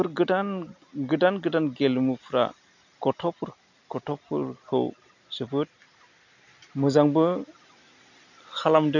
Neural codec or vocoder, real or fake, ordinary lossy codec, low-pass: none; real; none; 7.2 kHz